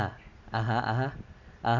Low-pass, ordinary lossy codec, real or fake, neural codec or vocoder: 7.2 kHz; none; real; none